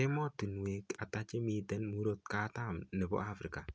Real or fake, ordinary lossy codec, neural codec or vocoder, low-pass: real; none; none; none